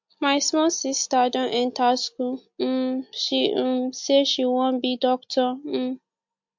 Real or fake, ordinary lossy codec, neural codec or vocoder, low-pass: real; MP3, 48 kbps; none; 7.2 kHz